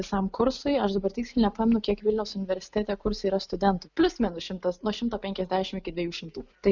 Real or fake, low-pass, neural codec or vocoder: real; 7.2 kHz; none